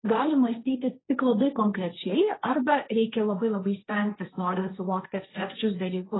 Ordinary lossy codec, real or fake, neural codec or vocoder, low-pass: AAC, 16 kbps; fake; codec, 16 kHz, 1.1 kbps, Voila-Tokenizer; 7.2 kHz